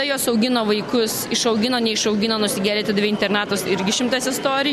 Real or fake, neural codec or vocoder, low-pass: real; none; 14.4 kHz